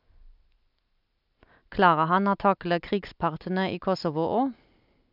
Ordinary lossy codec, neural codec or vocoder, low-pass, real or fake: none; none; 5.4 kHz; real